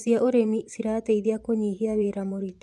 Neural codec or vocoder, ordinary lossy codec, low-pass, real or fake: vocoder, 24 kHz, 100 mel bands, Vocos; none; none; fake